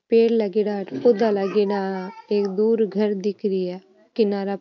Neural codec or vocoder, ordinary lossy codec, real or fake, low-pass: none; none; real; 7.2 kHz